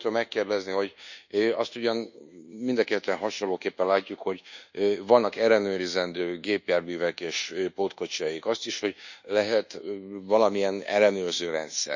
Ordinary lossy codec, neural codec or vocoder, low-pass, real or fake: none; codec, 24 kHz, 1.2 kbps, DualCodec; 7.2 kHz; fake